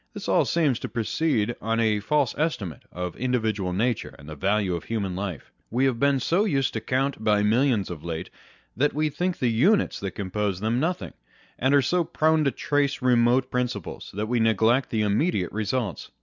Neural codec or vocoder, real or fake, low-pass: none; real; 7.2 kHz